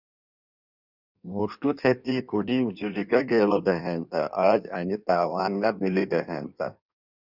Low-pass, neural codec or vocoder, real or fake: 5.4 kHz; codec, 16 kHz in and 24 kHz out, 1.1 kbps, FireRedTTS-2 codec; fake